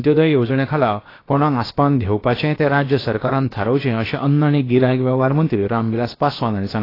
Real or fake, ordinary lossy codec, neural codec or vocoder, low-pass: fake; AAC, 24 kbps; codec, 16 kHz, about 1 kbps, DyCAST, with the encoder's durations; 5.4 kHz